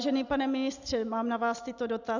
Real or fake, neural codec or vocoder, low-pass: real; none; 7.2 kHz